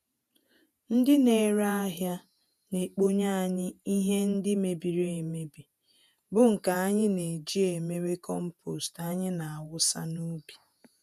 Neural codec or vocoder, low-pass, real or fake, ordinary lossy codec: vocoder, 48 kHz, 128 mel bands, Vocos; 14.4 kHz; fake; none